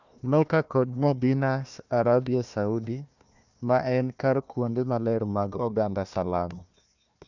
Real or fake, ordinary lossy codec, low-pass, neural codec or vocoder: fake; none; 7.2 kHz; codec, 16 kHz, 1 kbps, FunCodec, trained on Chinese and English, 50 frames a second